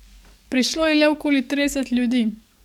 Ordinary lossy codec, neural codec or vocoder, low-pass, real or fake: none; codec, 44.1 kHz, 7.8 kbps, DAC; 19.8 kHz; fake